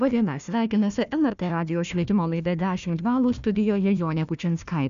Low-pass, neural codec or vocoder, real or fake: 7.2 kHz; codec, 16 kHz, 1 kbps, FunCodec, trained on Chinese and English, 50 frames a second; fake